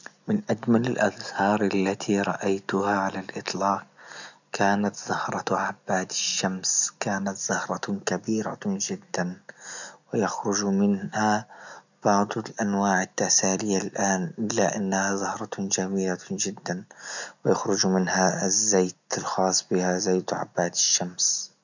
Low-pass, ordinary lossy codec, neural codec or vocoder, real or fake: 7.2 kHz; none; none; real